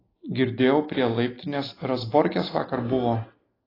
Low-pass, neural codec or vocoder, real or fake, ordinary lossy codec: 5.4 kHz; none; real; AAC, 24 kbps